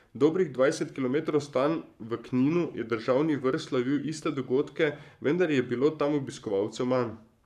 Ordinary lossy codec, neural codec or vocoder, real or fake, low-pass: none; codec, 44.1 kHz, 7.8 kbps, Pupu-Codec; fake; 14.4 kHz